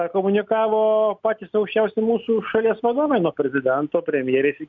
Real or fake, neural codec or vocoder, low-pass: real; none; 7.2 kHz